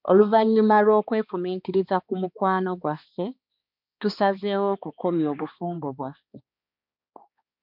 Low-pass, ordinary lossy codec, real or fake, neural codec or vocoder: 5.4 kHz; MP3, 48 kbps; fake; codec, 16 kHz, 2 kbps, X-Codec, HuBERT features, trained on balanced general audio